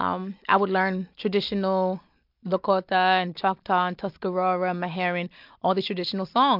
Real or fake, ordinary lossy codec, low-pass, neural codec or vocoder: real; MP3, 48 kbps; 5.4 kHz; none